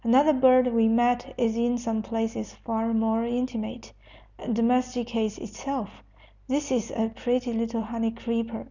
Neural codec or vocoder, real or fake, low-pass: none; real; 7.2 kHz